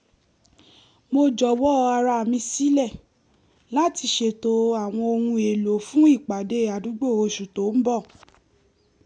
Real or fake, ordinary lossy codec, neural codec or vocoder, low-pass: real; none; none; 9.9 kHz